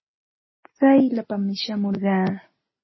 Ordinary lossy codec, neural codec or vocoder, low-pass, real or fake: MP3, 24 kbps; none; 7.2 kHz; real